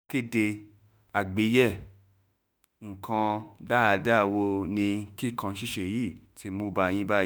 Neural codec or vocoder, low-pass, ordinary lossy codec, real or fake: autoencoder, 48 kHz, 32 numbers a frame, DAC-VAE, trained on Japanese speech; none; none; fake